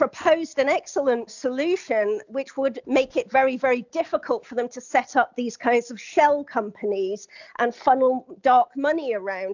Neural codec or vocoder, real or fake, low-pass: none; real; 7.2 kHz